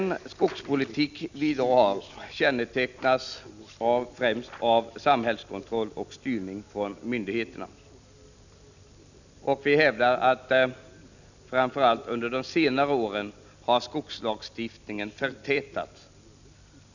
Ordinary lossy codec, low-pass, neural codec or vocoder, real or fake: none; 7.2 kHz; none; real